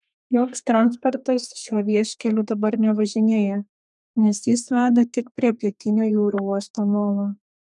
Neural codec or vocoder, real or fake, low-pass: codec, 32 kHz, 1.9 kbps, SNAC; fake; 10.8 kHz